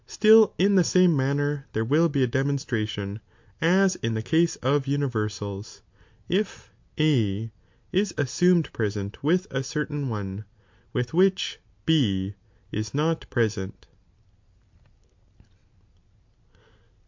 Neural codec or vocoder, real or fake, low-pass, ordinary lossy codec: none; real; 7.2 kHz; MP3, 48 kbps